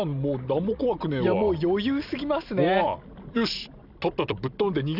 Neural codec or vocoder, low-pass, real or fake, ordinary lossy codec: none; 5.4 kHz; real; none